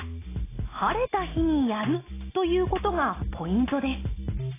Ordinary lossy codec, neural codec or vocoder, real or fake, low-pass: AAC, 16 kbps; none; real; 3.6 kHz